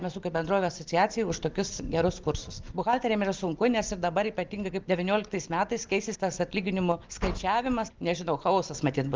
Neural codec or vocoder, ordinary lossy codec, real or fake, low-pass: none; Opus, 32 kbps; real; 7.2 kHz